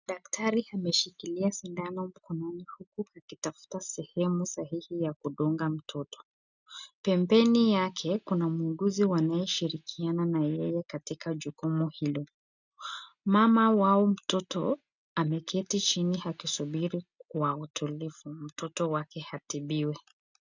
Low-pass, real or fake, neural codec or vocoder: 7.2 kHz; real; none